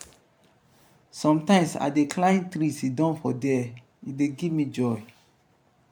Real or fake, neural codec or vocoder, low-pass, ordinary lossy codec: fake; vocoder, 44.1 kHz, 128 mel bands every 512 samples, BigVGAN v2; 19.8 kHz; MP3, 96 kbps